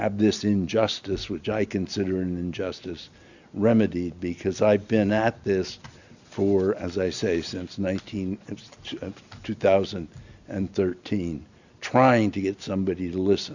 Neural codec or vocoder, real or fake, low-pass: none; real; 7.2 kHz